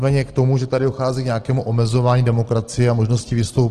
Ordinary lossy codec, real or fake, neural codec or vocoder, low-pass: Opus, 16 kbps; real; none; 9.9 kHz